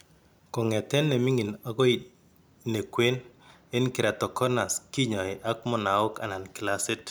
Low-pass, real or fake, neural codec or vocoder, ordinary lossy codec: none; real; none; none